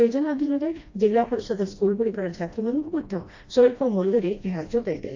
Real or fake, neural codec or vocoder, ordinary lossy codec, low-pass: fake; codec, 16 kHz, 1 kbps, FreqCodec, smaller model; AAC, 48 kbps; 7.2 kHz